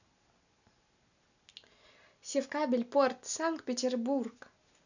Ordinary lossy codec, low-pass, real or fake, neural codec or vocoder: none; 7.2 kHz; real; none